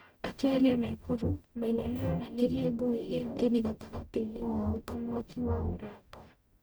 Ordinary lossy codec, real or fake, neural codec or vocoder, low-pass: none; fake; codec, 44.1 kHz, 0.9 kbps, DAC; none